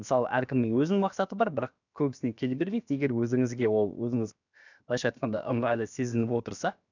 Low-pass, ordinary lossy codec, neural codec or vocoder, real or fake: 7.2 kHz; none; codec, 16 kHz, about 1 kbps, DyCAST, with the encoder's durations; fake